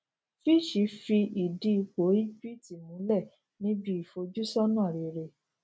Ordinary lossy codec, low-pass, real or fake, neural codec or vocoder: none; none; real; none